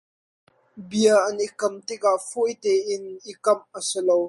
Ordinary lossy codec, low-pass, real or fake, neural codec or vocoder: MP3, 48 kbps; 14.4 kHz; real; none